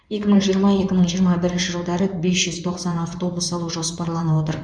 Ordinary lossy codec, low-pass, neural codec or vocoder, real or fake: none; 9.9 kHz; codec, 16 kHz in and 24 kHz out, 2.2 kbps, FireRedTTS-2 codec; fake